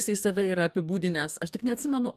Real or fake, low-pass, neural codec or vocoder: fake; 14.4 kHz; codec, 44.1 kHz, 2.6 kbps, DAC